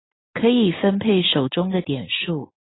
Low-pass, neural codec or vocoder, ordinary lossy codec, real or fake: 7.2 kHz; none; AAC, 16 kbps; real